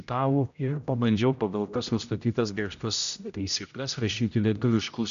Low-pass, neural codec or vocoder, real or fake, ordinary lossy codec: 7.2 kHz; codec, 16 kHz, 0.5 kbps, X-Codec, HuBERT features, trained on general audio; fake; MP3, 96 kbps